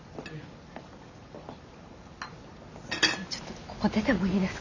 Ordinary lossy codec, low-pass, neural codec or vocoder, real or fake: none; 7.2 kHz; none; real